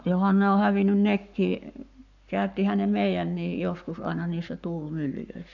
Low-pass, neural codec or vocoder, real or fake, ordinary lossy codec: 7.2 kHz; codec, 44.1 kHz, 7.8 kbps, Pupu-Codec; fake; none